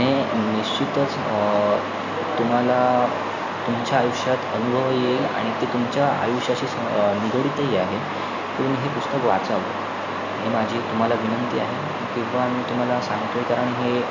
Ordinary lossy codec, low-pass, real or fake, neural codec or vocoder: Opus, 64 kbps; 7.2 kHz; real; none